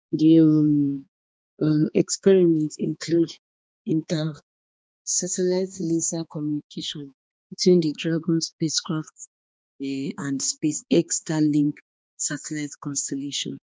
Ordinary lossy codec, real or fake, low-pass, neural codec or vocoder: none; fake; none; codec, 16 kHz, 2 kbps, X-Codec, HuBERT features, trained on balanced general audio